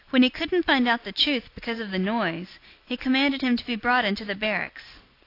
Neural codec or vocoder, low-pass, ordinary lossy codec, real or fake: none; 5.4 kHz; AAC, 32 kbps; real